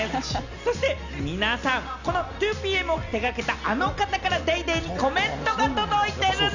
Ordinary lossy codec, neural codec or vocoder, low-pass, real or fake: none; none; 7.2 kHz; real